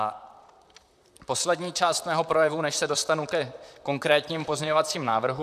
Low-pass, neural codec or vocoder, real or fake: 14.4 kHz; vocoder, 44.1 kHz, 128 mel bands, Pupu-Vocoder; fake